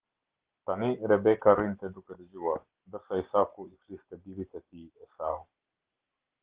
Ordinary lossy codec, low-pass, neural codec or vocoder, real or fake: Opus, 16 kbps; 3.6 kHz; none; real